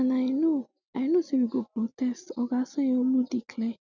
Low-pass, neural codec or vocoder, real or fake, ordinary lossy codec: 7.2 kHz; none; real; none